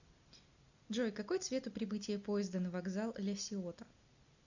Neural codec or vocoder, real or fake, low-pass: none; real; 7.2 kHz